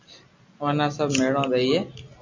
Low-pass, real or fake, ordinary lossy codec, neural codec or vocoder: 7.2 kHz; real; MP3, 48 kbps; none